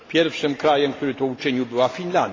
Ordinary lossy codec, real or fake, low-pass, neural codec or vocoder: AAC, 32 kbps; real; 7.2 kHz; none